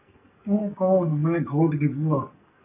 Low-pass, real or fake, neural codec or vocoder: 3.6 kHz; fake; codec, 44.1 kHz, 2.6 kbps, SNAC